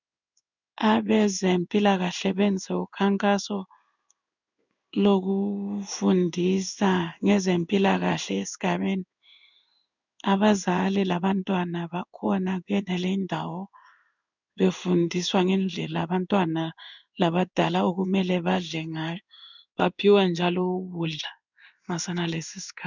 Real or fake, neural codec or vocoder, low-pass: fake; codec, 16 kHz in and 24 kHz out, 1 kbps, XY-Tokenizer; 7.2 kHz